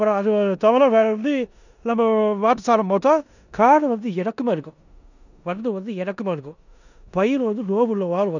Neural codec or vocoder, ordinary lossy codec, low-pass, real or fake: codec, 16 kHz in and 24 kHz out, 0.9 kbps, LongCat-Audio-Codec, four codebook decoder; none; 7.2 kHz; fake